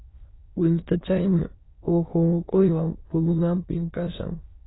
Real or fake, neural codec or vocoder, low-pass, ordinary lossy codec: fake; autoencoder, 22.05 kHz, a latent of 192 numbers a frame, VITS, trained on many speakers; 7.2 kHz; AAC, 16 kbps